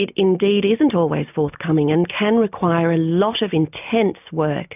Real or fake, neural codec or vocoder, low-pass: real; none; 3.6 kHz